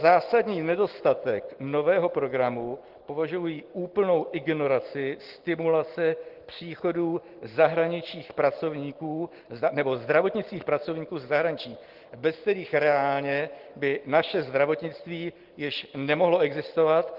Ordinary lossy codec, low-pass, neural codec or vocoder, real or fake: Opus, 16 kbps; 5.4 kHz; none; real